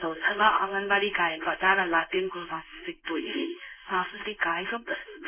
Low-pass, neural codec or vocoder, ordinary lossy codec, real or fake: 3.6 kHz; codec, 16 kHz in and 24 kHz out, 1 kbps, XY-Tokenizer; none; fake